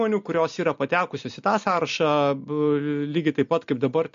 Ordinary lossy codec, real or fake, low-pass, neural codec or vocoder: MP3, 48 kbps; real; 7.2 kHz; none